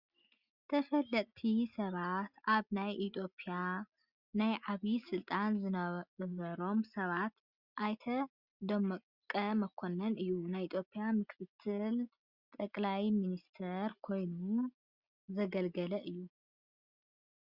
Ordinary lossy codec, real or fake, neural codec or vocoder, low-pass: Opus, 64 kbps; real; none; 5.4 kHz